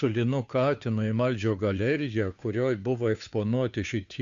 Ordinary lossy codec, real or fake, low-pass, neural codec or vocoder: MP3, 64 kbps; fake; 7.2 kHz; codec, 16 kHz, 2 kbps, FunCodec, trained on Chinese and English, 25 frames a second